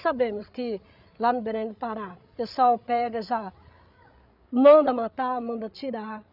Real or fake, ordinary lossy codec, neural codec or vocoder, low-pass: fake; none; codec, 16 kHz, 8 kbps, FreqCodec, larger model; 5.4 kHz